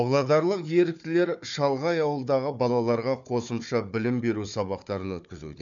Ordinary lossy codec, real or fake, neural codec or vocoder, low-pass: none; fake; codec, 16 kHz, 4 kbps, FunCodec, trained on Chinese and English, 50 frames a second; 7.2 kHz